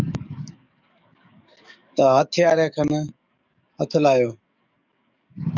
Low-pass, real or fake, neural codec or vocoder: 7.2 kHz; fake; codec, 44.1 kHz, 7.8 kbps, DAC